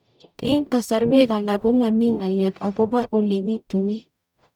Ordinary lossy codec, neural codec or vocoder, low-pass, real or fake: none; codec, 44.1 kHz, 0.9 kbps, DAC; 19.8 kHz; fake